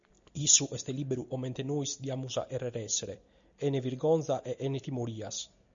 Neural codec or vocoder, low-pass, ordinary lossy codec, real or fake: none; 7.2 kHz; MP3, 64 kbps; real